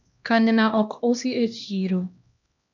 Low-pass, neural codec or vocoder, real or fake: 7.2 kHz; codec, 16 kHz, 1 kbps, X-Codec, HuBERT features, trained on LibriSpeech; fake